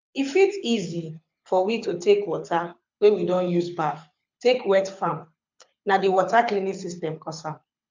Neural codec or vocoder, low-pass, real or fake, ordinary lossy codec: codec, 24 kHz, 6 kbps, HILCodec; 7.2 kHz; fake; MP3, 64 kbps